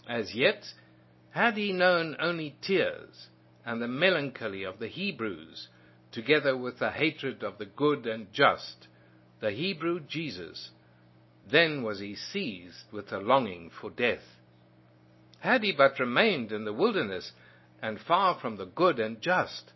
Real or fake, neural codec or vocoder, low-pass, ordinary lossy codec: real; none; 7.2 kHz; MP3, 24 kbps